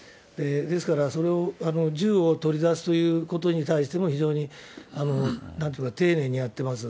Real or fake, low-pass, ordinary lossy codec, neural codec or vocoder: real; none; none; none